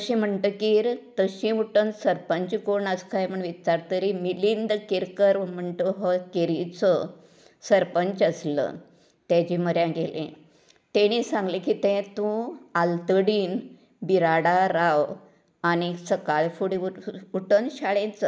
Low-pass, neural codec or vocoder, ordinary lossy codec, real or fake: none; none; none; real